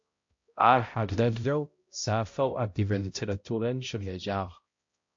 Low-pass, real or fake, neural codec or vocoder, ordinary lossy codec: 7.2 kHz; fake; codec, 16 kHz, 0.5 kbps, X-Codec, HuBERT features, trained on balanced general audio; MP3, 48 kbps